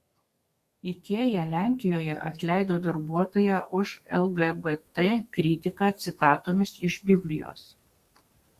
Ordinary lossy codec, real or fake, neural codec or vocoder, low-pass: Opus, 64 kbps; fake; codec, 32 kHz, 1.9 kbps, SNAC; 14.4 kHz